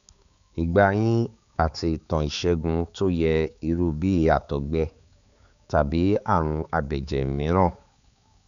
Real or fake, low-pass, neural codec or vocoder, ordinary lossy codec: fake; 7.2 kHz; codec, 16 kHz, 4 kbps, X-Codec, HuBERT features, trained on balanced general audio; none